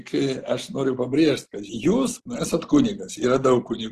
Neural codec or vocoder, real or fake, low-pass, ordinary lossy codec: none; real; 14.4 kHz; Opus, 16 kbps